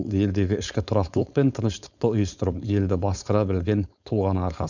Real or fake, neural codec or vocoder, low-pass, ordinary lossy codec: fake; codec, 16 kHz, 4.8 kbps, FACodec; 7.2 kHz; none